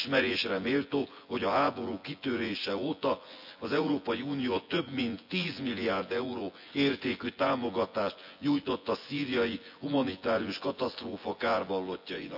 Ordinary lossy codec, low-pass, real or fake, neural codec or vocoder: AAC, 48 kbps; 5.4 kHz; fake; vocoder, 24 kHz, 100 mel bands, Vocos